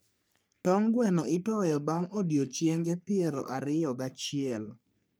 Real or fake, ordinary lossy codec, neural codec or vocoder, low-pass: fake; none; codec, 44.1 kHz, 3.4 kbps, Pupu-Codec; none